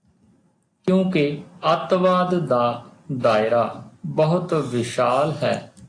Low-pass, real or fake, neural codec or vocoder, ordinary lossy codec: 9.9 kHz; real; none; AAC, 48 kbps